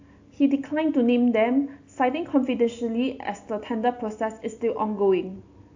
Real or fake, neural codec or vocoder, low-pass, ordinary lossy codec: real; none; 7.2 kHz; AAC, 48 kbps